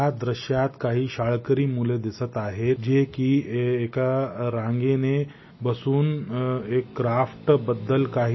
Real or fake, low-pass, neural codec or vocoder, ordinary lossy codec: real; 7.2 kHz; none; MP3, 24 kbps